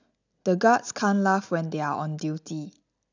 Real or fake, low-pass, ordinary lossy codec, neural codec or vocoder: real; 7.2 kHz; none; none